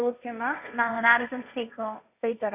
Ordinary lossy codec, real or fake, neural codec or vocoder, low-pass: none; fake; codec, 16 kHz, 1.1 kbps, Voila-Tokenizer; 3.6 kHz